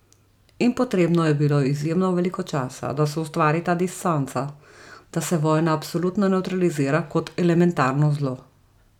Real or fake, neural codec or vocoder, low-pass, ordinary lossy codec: real; none; 19.8 kHz; none